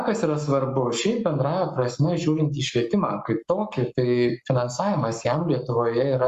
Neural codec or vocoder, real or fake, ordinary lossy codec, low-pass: codec, 44.1 kHz, 7.8 kbps, Pupu-Codec; fake; Opus, 64 kbps; 14.4 kHz